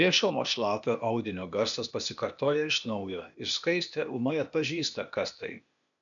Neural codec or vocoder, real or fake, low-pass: codec, 16 kHz, 0.8 kbps, ZipCodec; fake; 7.2 kHz